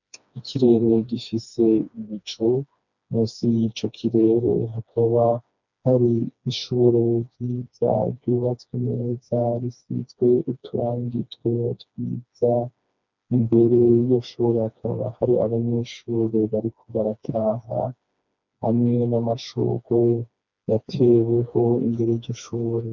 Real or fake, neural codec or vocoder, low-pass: fake; codec, 16 kHz, 2 kbps, FreqCodec, smaller model; 7.2 kHz